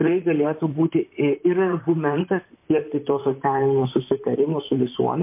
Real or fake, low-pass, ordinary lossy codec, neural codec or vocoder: fake; 3.6 kHz; MP3, 24 kbps; vocoder, 44.1 kHz, 128 mel bands, Pupu-Vocoder